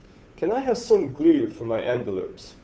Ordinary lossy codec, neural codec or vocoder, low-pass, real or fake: none; codec, 16 kHz, 2 kbps, FunCodec, trained on Chinese and English, 25 frames a second; none; fake